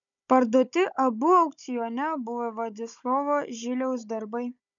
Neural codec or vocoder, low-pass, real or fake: codec, 16 kHz, 16 kbps, FunCodec, trained on Chinese and English, 50 frames a second; 7.2 kHz; fake